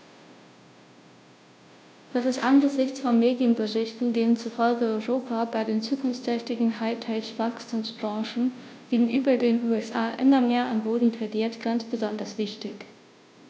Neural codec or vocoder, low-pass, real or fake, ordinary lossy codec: codec, 16 kHz, 0.5 kbps, FunCodec, trained on Chinese and English, 25 frames a second; none; fake; none